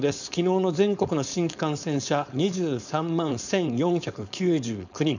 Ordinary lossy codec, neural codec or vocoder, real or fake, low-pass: none; codec, 16 kHz, 4.8 kbps, FACodec; fake; 7.2 kHz